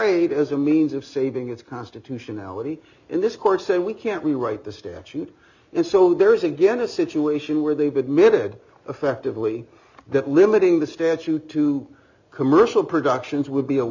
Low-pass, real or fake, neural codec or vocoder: 7.2 kHz; real; none